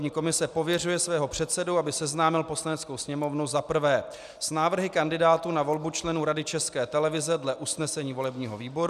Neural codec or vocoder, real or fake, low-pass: none; real; 14.4 kHz